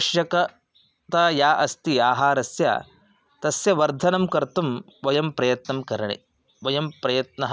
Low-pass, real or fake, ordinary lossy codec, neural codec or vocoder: none; real; none; none